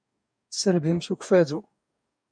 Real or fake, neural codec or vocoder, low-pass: fake; codec, 44.1 kHz, 2.6 kbps, DAC; 9.9 kHz